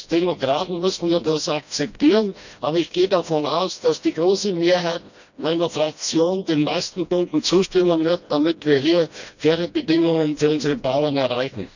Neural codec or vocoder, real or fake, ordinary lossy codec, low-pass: codec, 16 kHz, 1 kbps, FreqCodec, smaller model; fake; none; 7.2 kHz